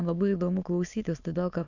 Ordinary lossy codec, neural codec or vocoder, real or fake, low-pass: AAC, 48 kbps; autoencoder, 22.05 kHz, a latent of 192 numbers a frame, VITS, trained on many speakers; fake; 7.2 kHz